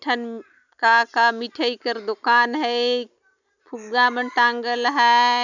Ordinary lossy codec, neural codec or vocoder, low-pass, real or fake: none; none; 7.2 kHz; real